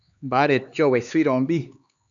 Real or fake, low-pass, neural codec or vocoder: fake; 7.2 kHz; codec, 16 kHz, 2 kbps, X-Codec, HuBERT features, trained on LibriSpeech